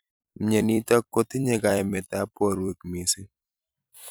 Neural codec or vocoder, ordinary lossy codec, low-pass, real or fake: vocoder, 44.1 kHz, 128 mel bands every 256 samples, BigVGAN v2; none; none; fake